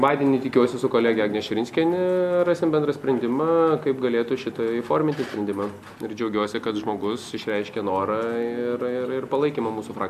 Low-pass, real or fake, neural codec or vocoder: 14.4 kHz; real; none